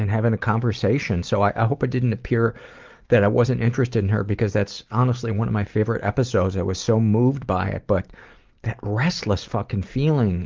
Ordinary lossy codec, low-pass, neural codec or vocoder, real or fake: Opus, 32 kbps; 7.2 kHz; none; real